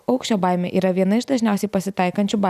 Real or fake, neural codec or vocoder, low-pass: real; none; 14.4 kHz